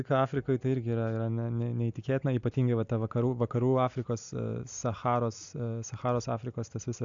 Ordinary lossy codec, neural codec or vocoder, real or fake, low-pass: AAC, 64 kbps; none; real; 7.2 kHz